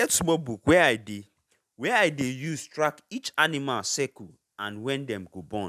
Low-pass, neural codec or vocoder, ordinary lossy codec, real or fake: 14.4 kHz; none; none; real